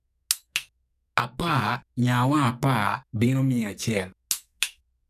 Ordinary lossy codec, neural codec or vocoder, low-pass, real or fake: none; codec, 44.1 kHz, 2.6 kbps, SNAC; 14.4 kHz; fake